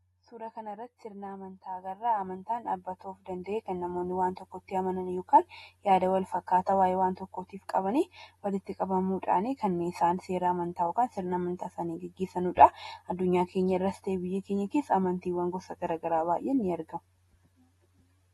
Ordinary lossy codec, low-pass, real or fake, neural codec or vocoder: AAC, 32 kbps; 19.8 kHz; real; none